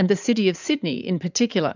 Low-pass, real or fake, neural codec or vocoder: 7.2 kHz; fake; vocoder, 44.1 kHz, 80 mel bands, Vocos